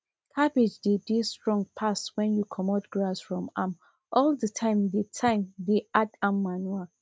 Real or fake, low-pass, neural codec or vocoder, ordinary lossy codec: real; none; none; none